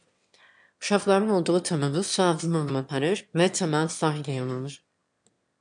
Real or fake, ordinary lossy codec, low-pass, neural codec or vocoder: fake; MP3, 64 kbps; 9.9 kHz; autoencoder, 22.05 kHz, a latent of 192 numbers a frame, VITS, trained on one speaker